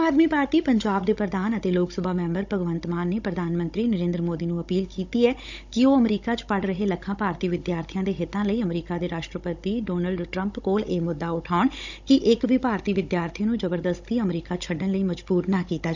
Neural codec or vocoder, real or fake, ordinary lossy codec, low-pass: codec, 16 kHz, 16 kbps, FunCodec, trained on Chinese and English, 50 frames a second; fake; none; 7.2 kHz